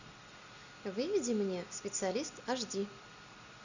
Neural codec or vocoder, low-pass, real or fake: none; 7.2 kHz; real